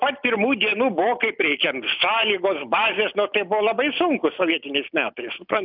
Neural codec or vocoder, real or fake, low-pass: none; real; 5.4 kHz